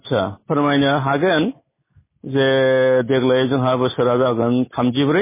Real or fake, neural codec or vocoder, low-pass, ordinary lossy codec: real; none; 3.6 kHz; MP3, 16 kbps